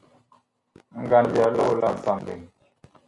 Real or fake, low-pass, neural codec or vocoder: real; 10.8 kHz; none